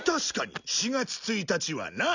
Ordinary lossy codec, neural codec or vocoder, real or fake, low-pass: none; none; real; 7.2 kHz